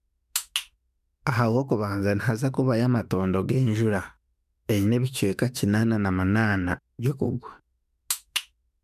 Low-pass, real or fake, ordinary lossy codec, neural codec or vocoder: 14.4 kHz; fake; none; autoencoder, 48 kHz, 32 numbers a frame, DAC-VAE, trained on Japanese speech